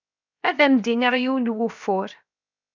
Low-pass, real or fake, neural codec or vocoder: 7.2 kHz; fake; codec, 16 kHz, 0.7 kbps, FocalCodec